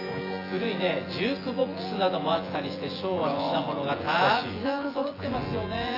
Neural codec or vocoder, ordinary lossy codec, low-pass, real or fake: vocoder, 24 kHz, 100 mel bands, Vocos; AAC, 24 kbps; 5.4 kHz; fake